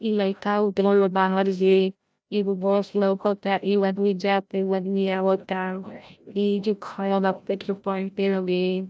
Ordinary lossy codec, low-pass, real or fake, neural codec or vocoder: none; none; fake; codec, 16 kHz, 0.5 kbps, FreqCodec, larger model